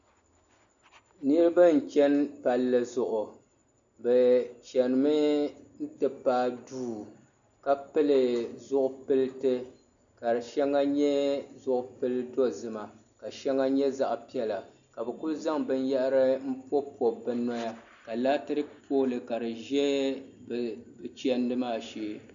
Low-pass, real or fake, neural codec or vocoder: 7.2 kHz; real; none